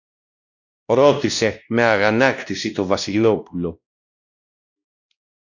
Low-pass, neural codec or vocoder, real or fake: 7.2 kHz; codec, 16 kHz, 1 kbps, X-Codec, WavLM features, trained on Multilingual LibriSpeech; fake